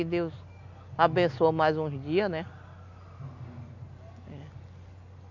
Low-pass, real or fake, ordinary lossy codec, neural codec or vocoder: 7.2 kHz; real; none; none